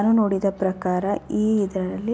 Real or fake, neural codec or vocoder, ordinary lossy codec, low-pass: real; none; none; none